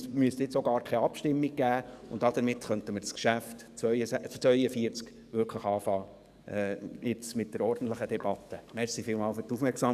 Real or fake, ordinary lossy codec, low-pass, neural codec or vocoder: fake; none; 14.4 kHz; codec, 44.1 kHz, 7.8 kbps, DAC